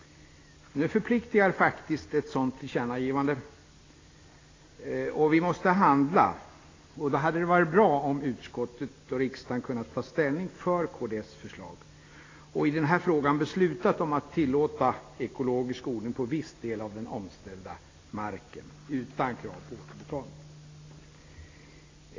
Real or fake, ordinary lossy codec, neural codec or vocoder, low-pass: real; AAC, 32 kbps; none; 7.2 kHz